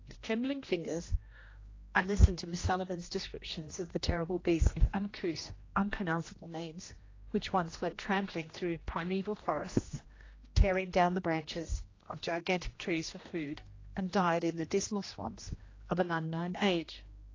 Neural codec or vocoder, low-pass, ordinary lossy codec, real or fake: codec, 16 kHz, 1 kbps, X-Codec, HuBERT features, trained on general audio; 7.2 kHz; AAC, 32 kbps; fake